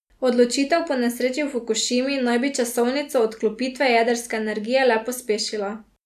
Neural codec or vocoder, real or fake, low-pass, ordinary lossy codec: none; real; 14.4 kHz; none